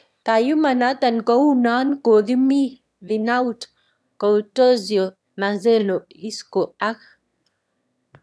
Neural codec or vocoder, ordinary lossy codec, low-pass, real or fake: autoencoder, 22.05 kHz, a latent of 192 numbers a frame, VITS, trained on one speaker; none; none; fake